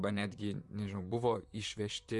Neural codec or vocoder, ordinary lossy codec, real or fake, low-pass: vocoder, 44.1 kHz, 128 mel bands, Pupu-Vocoder; Opus, 64 kbps; fake; 10.8 kHz